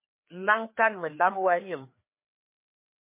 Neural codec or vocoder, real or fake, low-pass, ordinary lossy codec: codec, 16 kHz, 2 kbps, FreqCodec, larger model; fake; 3.6 kHz; MP3, 24 kbps